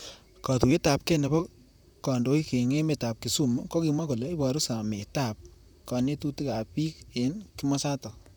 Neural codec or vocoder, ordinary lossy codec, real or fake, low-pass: vocoder, 44.1 kHz, 128 mel bands, Pupu-Vocoder; none; fake; none